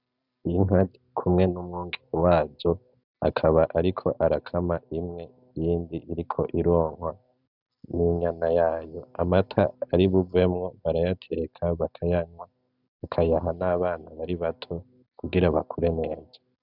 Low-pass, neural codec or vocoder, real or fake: 5.4 kHz; none; real